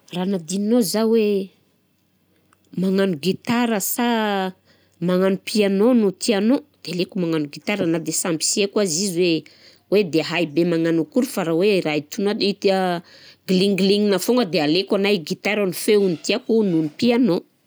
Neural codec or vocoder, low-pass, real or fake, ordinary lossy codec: none; none; real; none